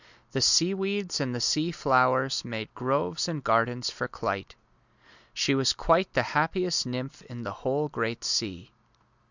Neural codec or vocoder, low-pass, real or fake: none; 7.2 kHz; real